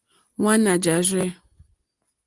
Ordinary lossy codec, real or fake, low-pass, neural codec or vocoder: Opus, 24 kbps; real; 10.8 kHz; none